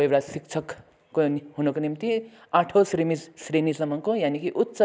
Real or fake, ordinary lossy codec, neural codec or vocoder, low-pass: real; none; none; none